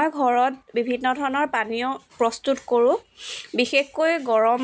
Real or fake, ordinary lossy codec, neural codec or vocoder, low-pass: real; none; none; none